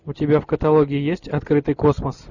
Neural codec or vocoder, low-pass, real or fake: none; 7.2 kHz; real